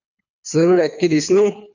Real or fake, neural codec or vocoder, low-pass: fake; codec, 24 kHz, 3 kbps, HILCodec; 7.2 kHz